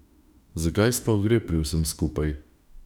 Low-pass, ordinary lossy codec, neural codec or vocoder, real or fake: 19.8 kHz; none; autoencoder, 48 kHz, 32 numbers a frame, DAC-VAE, trained on Japanese speech; fake